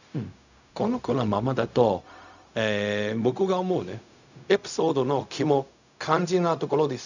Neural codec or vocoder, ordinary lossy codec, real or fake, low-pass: codec, 16 kHz, 0.4 kbps, LongCat-Audio-Codec; none; fake; 7.2 kHz